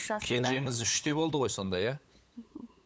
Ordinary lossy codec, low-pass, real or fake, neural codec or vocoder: none; none; fake; codec, 16 kHz, 8 kbps, FunCodec, trained on LibriTTS, 25 frames a second